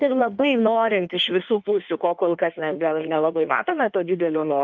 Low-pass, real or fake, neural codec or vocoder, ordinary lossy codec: 7.2 kHz; fake; codec, 16 kHz in and 24 kHz out, 1.1 kbps, FireRedTTS-2 codec; Opus, 32 kbps